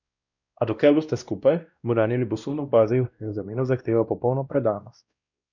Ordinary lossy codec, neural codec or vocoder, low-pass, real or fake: none; codec, 16 kHz, 1 kbps, X-Codec, WavLM features, trained on Multilingual LibriSpeech; 7.2 kHz; fake